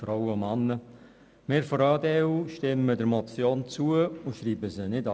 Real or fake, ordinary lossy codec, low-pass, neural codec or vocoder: real; none; none; none